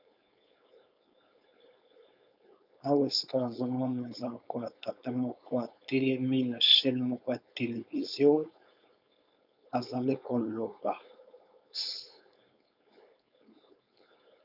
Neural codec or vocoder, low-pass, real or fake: codec, 16 kHz, 4.8 kbps, FACodec; 5.4 kHz; fake